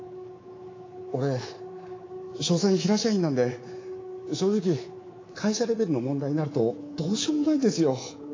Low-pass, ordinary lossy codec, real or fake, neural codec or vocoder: 7.2 kHz; AAC, 32 kbps; fake; codec, 24 kHz, 3.1 kbps, DualCodec